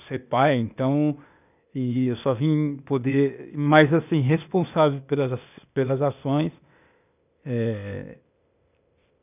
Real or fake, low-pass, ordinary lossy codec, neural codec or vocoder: fake; 3.6 kHz; none; codec, 16 kHz, 0.8 kbps, ZipCodec